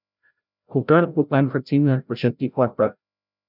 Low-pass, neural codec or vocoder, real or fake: 5.4 kHz; codec, 16 kHz, 0.5 kbps, FreqCodec, larger model; fake